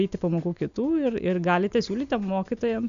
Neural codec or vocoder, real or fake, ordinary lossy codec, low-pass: none; real; AAC, 96 kbps; 7.2 kHz